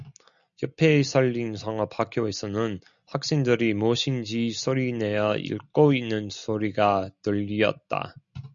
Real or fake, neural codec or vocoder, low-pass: real; none; 7.2 kHz